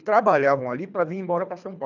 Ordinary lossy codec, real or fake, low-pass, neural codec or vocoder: none; fake; 7.2 kHz; codec, 24 kHz, 3 kbps, HILCodec